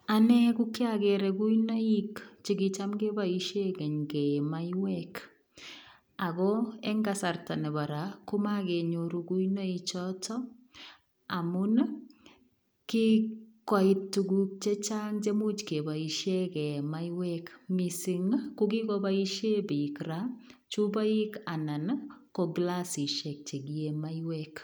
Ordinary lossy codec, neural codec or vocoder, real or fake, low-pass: none; none; real; none